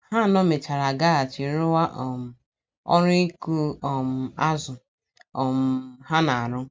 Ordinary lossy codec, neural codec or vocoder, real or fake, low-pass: none; none; real; none